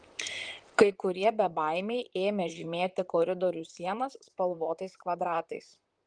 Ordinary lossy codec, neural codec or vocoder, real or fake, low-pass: Opus, 24 kbps; vocoder, 44.1 kHz, 128 mel bands, Pupu-Vocoder; fake; 9.9 kHz